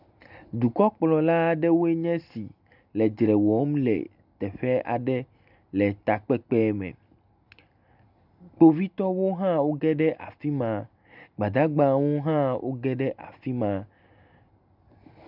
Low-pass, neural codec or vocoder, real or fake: 5.4 kHz; none; real